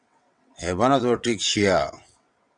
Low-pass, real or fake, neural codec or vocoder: 9.9 kHz; fake; vocoder, 22.05 kHz, 80 mel bands, WaveNeXt